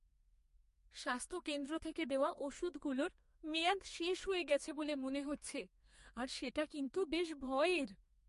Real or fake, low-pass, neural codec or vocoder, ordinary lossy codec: fake; 14.4 kHz; codec, 32 kHz, 1.9 kbps, SNAC; MP3, 48 kbps